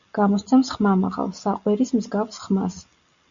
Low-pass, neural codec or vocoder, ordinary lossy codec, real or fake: 7.2 kHz; none; Opus, 64 kbps; real